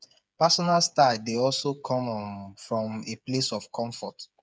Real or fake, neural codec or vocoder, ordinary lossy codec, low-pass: fake; codec, 16 kHz, 8 kbps, FreqCodec, smaller model; none; none